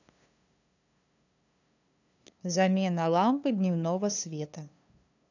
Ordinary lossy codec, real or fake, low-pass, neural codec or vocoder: none; fake; 7.2 kHz; codec, 16 kHz, 2 kbps, FunCodec, trained on LibriTTS, 25 frames a second